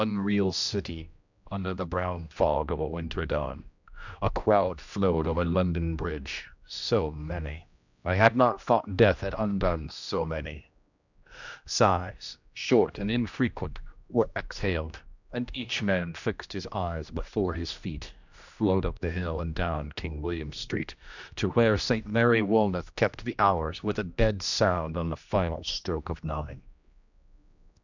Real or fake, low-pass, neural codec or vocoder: fake; 7.2 kHz; codec, 16 kHz, 1 kbps, X-Codec, HuBERT features, trained on general audio